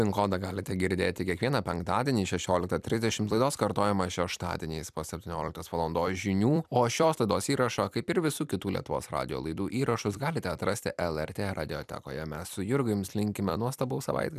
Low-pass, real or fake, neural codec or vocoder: 14.4 kHz; fake; vocoder, 44.1 kHz, 128 mel bands every 256 samples, BigVGAN v2